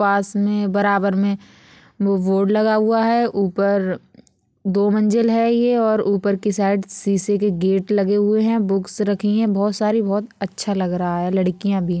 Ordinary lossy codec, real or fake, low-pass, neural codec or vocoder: none; real; none; none